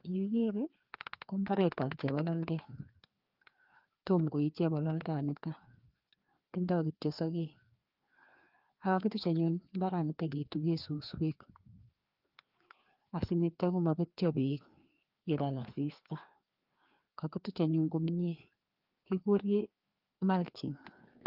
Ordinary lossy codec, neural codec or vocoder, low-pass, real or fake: Opus, 24 kbps; codec, 16 kHz, 2 kbps, FreqCodec, larger model; 5.4 kHz; fake